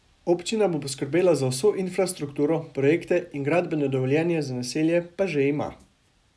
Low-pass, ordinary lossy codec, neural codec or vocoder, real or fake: none; none; none; real